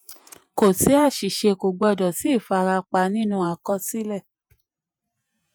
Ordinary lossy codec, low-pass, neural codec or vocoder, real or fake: none; none; vocoder, 48 kHz, 128 mel bands, Vocos; fake